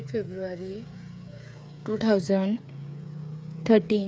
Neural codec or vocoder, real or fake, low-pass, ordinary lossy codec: codec, 16 kHz, 8 kbps, FreqCodec, smaller model; fake; none; none